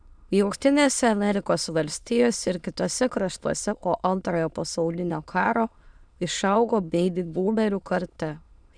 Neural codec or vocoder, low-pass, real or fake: autoencoder, 22.05 kHz, a latent of 192 numbers a frame, VITS, trained on many speakers; 9.9 kHz; fake